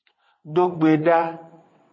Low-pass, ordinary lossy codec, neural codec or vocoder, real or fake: 7.2 kHz; MP3, 32 kbps; vocoder, 22.05 kHz, 80 mel bands, WaveNeXt; fake